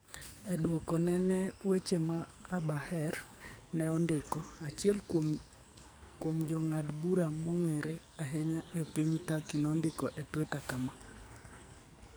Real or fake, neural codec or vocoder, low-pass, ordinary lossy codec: fake; codec, 44.1 kHz, 2.6 kbps, SNAC; none; none